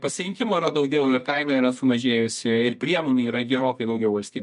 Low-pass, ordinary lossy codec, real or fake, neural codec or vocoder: 10.8 kHz; MP3, 64 kbps; fake; codec, 24 kHz, 0.9 kbps, WavTokenizer, medium music audio release